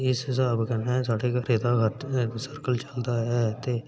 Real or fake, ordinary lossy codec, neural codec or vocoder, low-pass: real; none; none; none